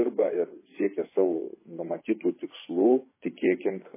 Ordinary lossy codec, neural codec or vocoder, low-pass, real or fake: MP3, 16 kbps; vocoder, 24 kHz, 100 mel bands, Vocos; 3.6 kHz; fake